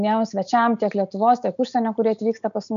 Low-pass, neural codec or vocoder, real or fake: 7.2 kHz; none; real